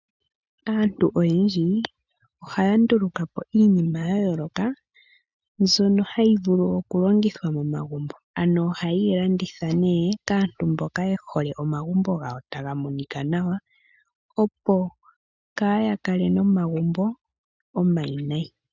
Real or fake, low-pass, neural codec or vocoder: real; 7.2 kHz; none